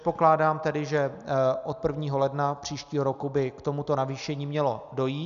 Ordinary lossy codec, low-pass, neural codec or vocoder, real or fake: AAC, 96 kbps; 7.2 kHz; none; real